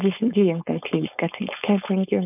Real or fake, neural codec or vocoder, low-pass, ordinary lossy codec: fake; codec, 16 kHz, 4.8 kbps, FACodec; 3.6 kHz; none